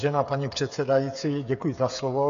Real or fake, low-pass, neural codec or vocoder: fake; 7.2 kHz; codec, 16 kHz, 8 kbps, FreqCodec, smaller model